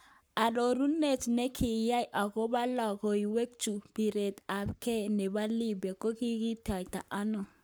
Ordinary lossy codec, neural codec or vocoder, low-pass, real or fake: none; codec, 44.1 kHz, 7.8 kbps, Pupu-Codec; none; fake